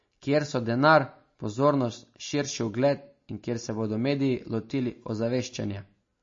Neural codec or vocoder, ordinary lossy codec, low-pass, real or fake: none; MP3, 32 kbps; 7.2 kHz; real